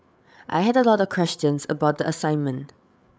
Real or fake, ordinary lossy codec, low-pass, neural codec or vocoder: fake; none; none; codec, 16 kHz, 8 kbps, FreqCodec, larger model